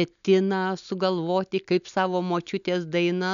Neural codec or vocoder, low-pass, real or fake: none; 7.2 kHz; real